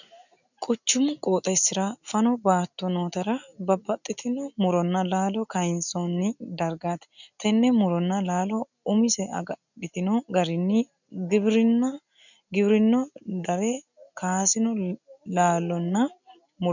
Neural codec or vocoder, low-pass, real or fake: none; 7.2 kHz; real